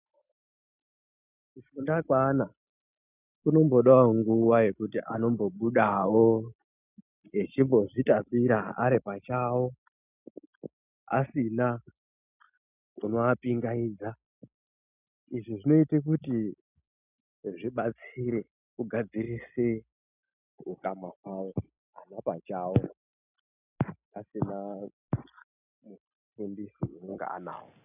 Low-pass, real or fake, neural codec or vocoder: 3.6 kHz; real; none